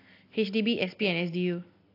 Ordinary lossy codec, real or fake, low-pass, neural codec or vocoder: AAC, 32 kbps; real; 5.4 kHz; none